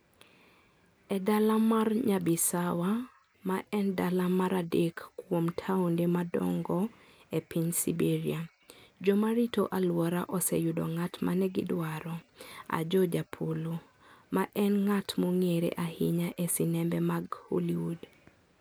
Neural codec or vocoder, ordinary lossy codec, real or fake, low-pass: none; none; real; none